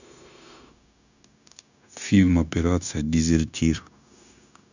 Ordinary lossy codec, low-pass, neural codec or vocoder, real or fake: none; 7.2 kHz; codec, 16 kHz, 0.9 kbps, LongCat-Audio-Codec; fake